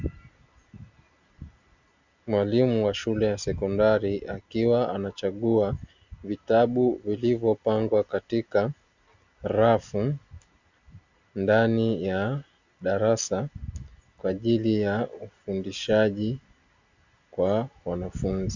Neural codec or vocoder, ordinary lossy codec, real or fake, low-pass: none; Opus, 64 kbps; real; 7.2 kHz